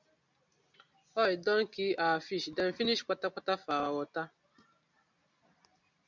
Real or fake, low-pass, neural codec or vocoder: real; 7.2 kHz; none